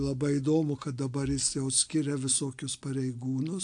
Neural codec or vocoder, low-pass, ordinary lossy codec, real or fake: none; 9.9 kHz; AAC, 64 kbps; real